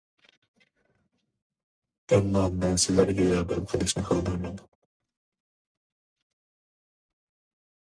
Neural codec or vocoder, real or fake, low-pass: codec, 44.1 kHz, 1.7 kbps, Pupu-Codec; fake; 9.9 kHz